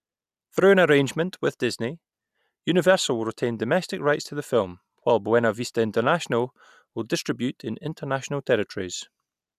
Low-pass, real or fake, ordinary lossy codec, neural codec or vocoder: 14.4 kHz; real; none; none